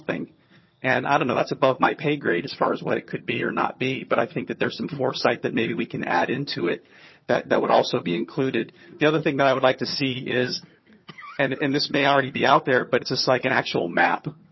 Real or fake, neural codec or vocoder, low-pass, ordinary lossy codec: fake; vocoder, 22.05 kHz, 80 mel bands, HiFi-GAN; 7.2 kHz; MP3, 24 kbps